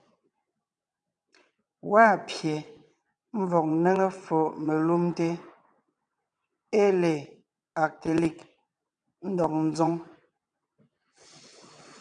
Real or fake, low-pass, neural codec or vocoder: fake; 9.9 kHz; vocoder, 22.05 kHz, 80 mel bands, WaveNeXt